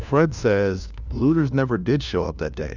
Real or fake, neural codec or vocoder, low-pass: fake; codec, 16 kHz, 1 kbps, FunCodec, trained on LibriTTS, 50 frames a second; 7.2 kHz